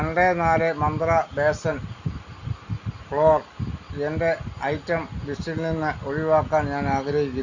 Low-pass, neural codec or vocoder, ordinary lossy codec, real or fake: 7.2 kHz; none; Opus, 64 kbps; real